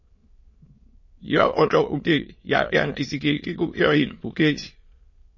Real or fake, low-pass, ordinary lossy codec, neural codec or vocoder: fake; 7.2 kHz; MP3, 32 kbps; autoencoder, 22.05 kHz, a latent of 192 numbers a frame, VITS, trained on many speakers